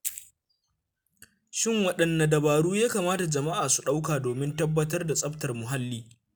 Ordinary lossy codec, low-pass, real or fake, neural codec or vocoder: none; none; real; none